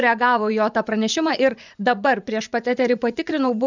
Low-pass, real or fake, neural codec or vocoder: 7.2 kHz; fake; vocoder, 24 kHz, 100 mel bands, Vocos